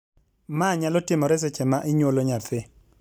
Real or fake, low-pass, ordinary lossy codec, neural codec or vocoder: real; 19.8 kHz; none; none